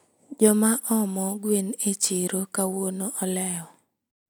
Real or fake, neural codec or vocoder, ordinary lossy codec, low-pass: real; none; none; none